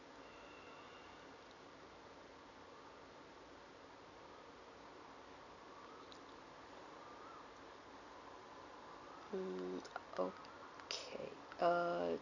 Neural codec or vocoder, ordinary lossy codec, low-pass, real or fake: none; none; 7.2 kHz; real